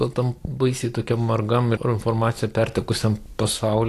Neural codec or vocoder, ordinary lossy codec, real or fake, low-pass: none; AAC, 64 kbps; real; 14.4 kHz